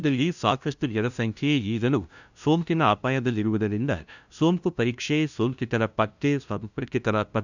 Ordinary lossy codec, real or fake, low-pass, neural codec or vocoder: none; fake; 7.2 kHz; codec, 16 kHz, 0.5 kbps, FunCodec, trained on LibriTTS, 25 frames a second